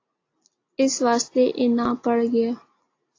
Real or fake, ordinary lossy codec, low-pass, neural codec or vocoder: real; AAC, 32 kbps; 7.2 kHz; none